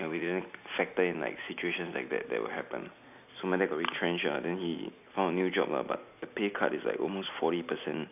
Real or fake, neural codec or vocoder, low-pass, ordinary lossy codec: real; none; 3.6 kHz; none